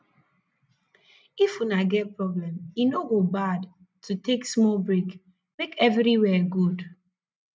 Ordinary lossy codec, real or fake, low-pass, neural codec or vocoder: none; real; none; none